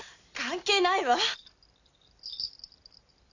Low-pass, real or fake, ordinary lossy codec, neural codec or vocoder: 7.2 kHz; real; none; none